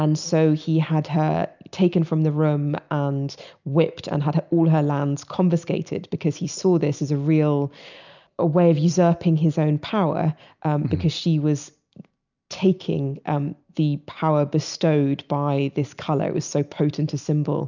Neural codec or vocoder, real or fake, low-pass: none; real; 7.2 kHz